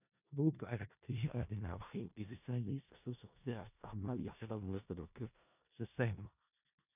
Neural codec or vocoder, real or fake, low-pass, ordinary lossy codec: codec, 16 kHz in and 24 kHz out, 0.4 kbps, LongCat-Audio-Codec, four codebook decoder; fake; 3.6 kHz; none